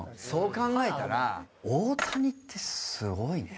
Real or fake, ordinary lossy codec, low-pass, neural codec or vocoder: real; none; none; none